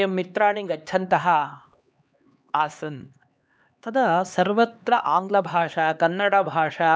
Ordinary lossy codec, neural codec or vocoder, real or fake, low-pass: none; codec, 16 kHz, 2 kbps, X-Codec, HuBERT features, trained on LibriSpeech; fake; none